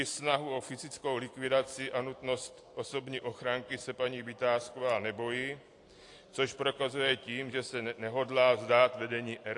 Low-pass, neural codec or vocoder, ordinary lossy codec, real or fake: 10.8 kHz; none; AAC, 48 kbps; real